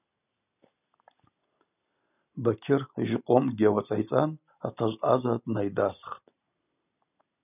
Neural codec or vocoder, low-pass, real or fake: none; 3.6 kHz; real